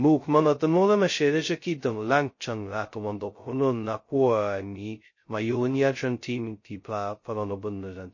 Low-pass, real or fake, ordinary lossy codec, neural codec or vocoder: 7.2 kHz; fake; MP3, 32 kbps; codec, 16 kHz, 0.2 kbps, FocalCodec